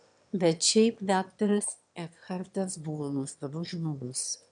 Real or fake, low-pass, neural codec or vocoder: fake; 9.9 kHz; autoencoder, 22.05 kHz, a latent of 192 numbers a frame, VITS, trained on one speaker